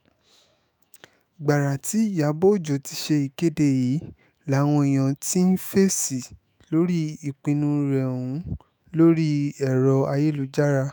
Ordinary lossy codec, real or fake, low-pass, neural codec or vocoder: none; fake; none; autoencoder, 48 kHz, 128 numbers a frame, DAC-VAE, trained on Japanese speech